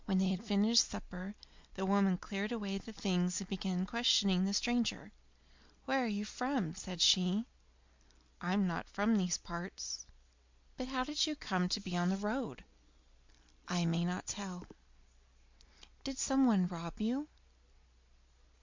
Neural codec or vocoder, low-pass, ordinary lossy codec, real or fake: none; 7.2 kHz; MP3, 64 kbps; real